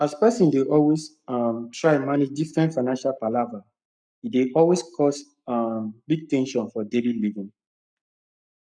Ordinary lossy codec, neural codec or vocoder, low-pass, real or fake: none; codec, 44.1 kHz, 7.8 kbps, Pupu-Codec; 9.9 kHz; fake